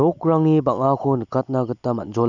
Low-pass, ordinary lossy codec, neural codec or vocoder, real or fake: 7.2 kHz; none; none; real